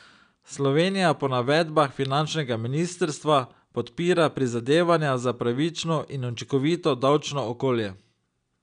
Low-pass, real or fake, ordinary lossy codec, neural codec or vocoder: 9.9 kHz; real; none; none